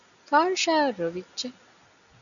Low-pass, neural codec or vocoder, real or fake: 7.2 kHz; none; real